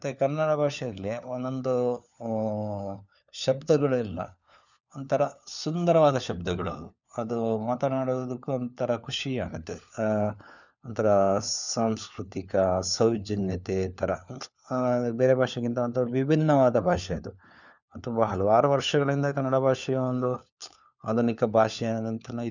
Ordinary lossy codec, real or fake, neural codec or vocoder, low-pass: none; fake; codec, 16 kHz, 4 kbps, FunCodec, trained on LibriTTS, 50 frames a second; 7.2 kHz